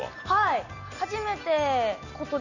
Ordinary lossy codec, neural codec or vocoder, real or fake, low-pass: none; none; real; 7.2 kHz